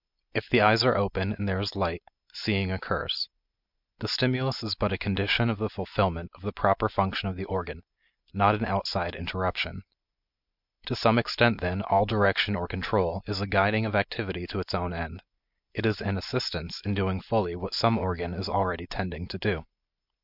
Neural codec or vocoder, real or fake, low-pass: none; real; 5.4 kHz